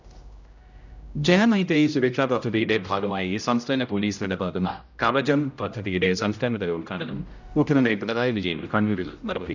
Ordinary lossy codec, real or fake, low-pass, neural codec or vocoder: none; fake; 7.2 kHz; codec, 16 kHz, 0.5 kbps, X-Codec, HuBERT features, trained on general audio